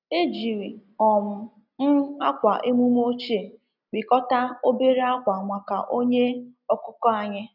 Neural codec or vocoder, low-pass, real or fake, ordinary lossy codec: none; 5.4 kHz; real; none